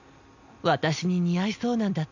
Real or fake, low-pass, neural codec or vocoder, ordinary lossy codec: real; 7.2 kHz; none; none